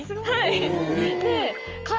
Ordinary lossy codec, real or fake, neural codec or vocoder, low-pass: Opus, 24 kbps; real; none; 7.2 kHz